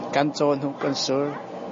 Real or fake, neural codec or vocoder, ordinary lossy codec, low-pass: real; none; MP3, 32 kbps; 7.2 kHz